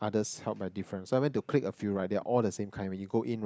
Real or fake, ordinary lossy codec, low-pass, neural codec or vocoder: real; none; none; none